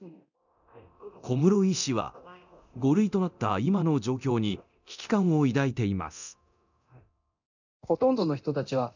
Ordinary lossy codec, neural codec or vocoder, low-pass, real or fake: none; codec, 24 kHz, 0.9 kbps, DualCodec; 7.2 kHz; fake